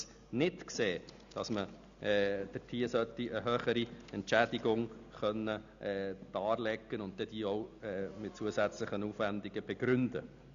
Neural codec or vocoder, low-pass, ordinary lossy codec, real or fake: none; 7.2 kHz; none; real